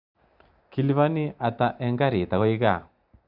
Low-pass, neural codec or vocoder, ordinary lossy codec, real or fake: 5.4 kHz; none; Opus, 64 kbps; real